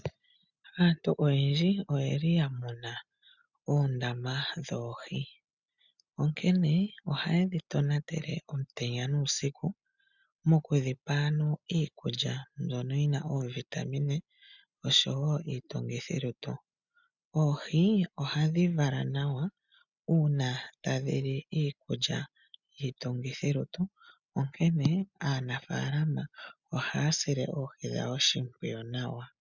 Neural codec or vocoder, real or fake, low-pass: none; real; 7.2 kHz